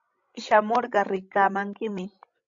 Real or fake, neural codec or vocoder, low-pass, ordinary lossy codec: fake; codec, 16 kHz, 16 kbps, FreqCodec, larger model; 7.2 kHz; MP3, 64 kbps